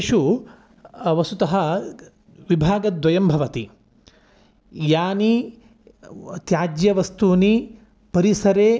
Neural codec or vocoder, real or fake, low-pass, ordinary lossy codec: none; real; none; none